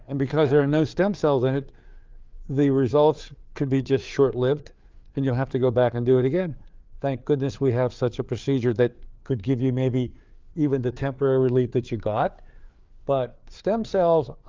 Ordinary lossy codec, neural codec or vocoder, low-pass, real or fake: Opus, 32 kbps; codec, 16 kHz, 4 kbps, FreqCodec, larger model; 7.2 kHz; fake